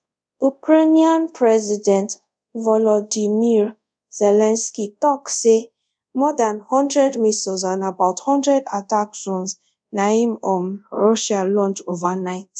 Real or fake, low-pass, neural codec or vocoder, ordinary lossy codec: fake; 9.9 kHz; codec, 24 kHz, 0.5 kbps, DualCodec; none